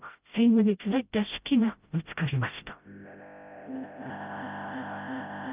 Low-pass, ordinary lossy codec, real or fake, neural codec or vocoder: 3.6 kHz; Opus, 24 kbps; fake; codec, 16 kHz, 0.5 kbps, FreqCodec, smaller model